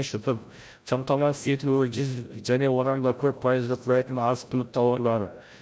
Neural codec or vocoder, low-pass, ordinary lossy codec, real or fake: codec, 16 kHz, 0.5 kbps, FreqCodec, larger model; none; none; fake